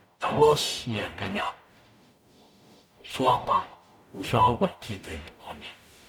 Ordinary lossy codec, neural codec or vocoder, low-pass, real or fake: none; codec, 44.1 kHz, 0.9 kbps, DAC; 19.8 kHz; fake